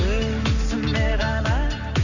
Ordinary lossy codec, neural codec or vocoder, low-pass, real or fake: none; none; 7.2 kHz; real